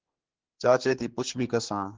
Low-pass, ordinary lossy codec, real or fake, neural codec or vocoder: 7.2 kHz; Opus, 16 kbps; fake; codec, 16 kHz, 4 kbps, X-Codec, HuBERT features, trained on general audio